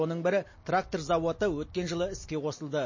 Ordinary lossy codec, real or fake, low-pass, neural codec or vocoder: MP3, 32 kbps; real; 7.2 kHz; none